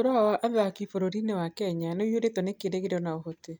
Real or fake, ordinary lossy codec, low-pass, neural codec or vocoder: real; none; none; none